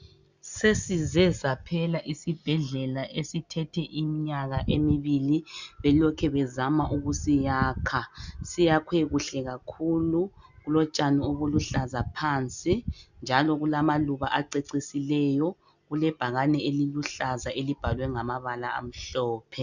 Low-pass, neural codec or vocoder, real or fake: 7.2 kHz; none; real